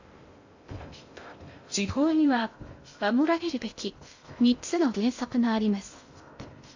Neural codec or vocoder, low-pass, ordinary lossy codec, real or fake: codec, 16 kHz in and 24 kHz out, 0.6 kbps, FocalCodec, streaming, 2048 codes; 7.2 kHz; AAC, 48 kbps; fake